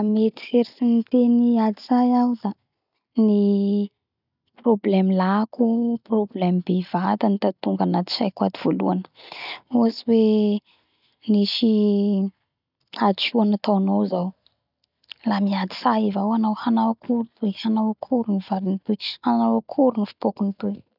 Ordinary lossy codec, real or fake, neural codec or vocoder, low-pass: none; real; none; 5.4 kHz